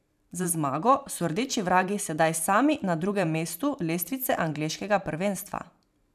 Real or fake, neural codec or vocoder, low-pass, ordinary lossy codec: fake; vocoder, 44.1 kHz, 128 mel bands every 512 samples, BigVGAN v2; 14.4 kHz; none